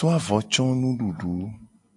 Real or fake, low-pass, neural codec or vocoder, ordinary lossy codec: real; 10.8 kHz; none; AAC, 64 kbps